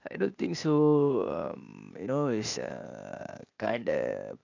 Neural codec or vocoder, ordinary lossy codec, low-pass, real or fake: codec, 16 kHz, 0.8 kbps, ZipCodec; none; 7.2 kHz; fake